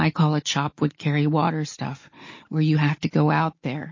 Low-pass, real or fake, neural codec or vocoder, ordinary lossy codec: 7.2 kHz; real; none; MP3, 32 kbps